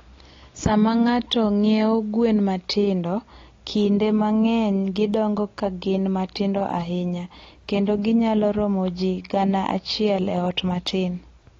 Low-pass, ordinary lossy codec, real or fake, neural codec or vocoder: 7.2 kHz; AAC, 32 kbps; real; none